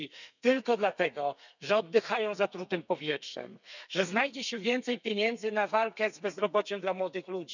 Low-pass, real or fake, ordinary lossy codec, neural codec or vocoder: 7.2 kHz; fake; none; codec, 32 kHz, 1.9 kbps, SNAC